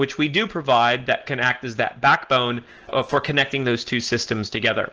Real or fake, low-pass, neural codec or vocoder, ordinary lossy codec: real; 7.2 kHz; none; Opus, 16 kbps